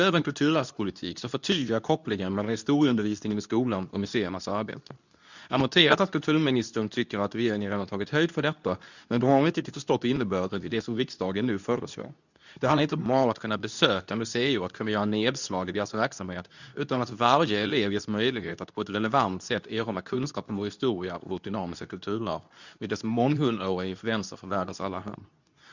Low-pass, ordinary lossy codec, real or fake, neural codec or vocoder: 7.2 kHz; none; fake; codec, 24 kHz, 0.9 kbps, WavTokenizer, medium speech release version 2